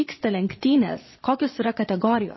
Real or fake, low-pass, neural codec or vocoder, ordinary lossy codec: fake; 7.2 kHz; vocoder, 22.05 kHz, 80 mel bands, Vocos; MP3, 24 kbps